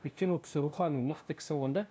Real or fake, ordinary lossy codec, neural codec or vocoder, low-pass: fake; none; codec, 16 kHz, 0.5 kbps, FunCodec, trained on LibriTTS, 25 frames a second; none